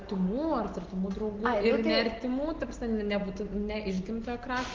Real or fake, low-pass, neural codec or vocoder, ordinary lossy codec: real; 7.2 kHz; none; Opus, 16 kbps